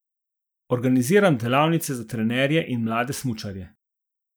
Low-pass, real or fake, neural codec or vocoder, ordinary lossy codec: none; real; none; none